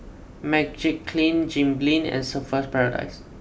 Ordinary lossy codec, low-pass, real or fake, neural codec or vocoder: none; none; real; none